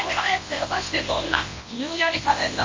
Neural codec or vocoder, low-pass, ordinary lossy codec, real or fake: codec, 24 kHz, 0.9 kbps, WavTokenizer, large speech release; 7.2 kHz; MP3, 32 kbps; fake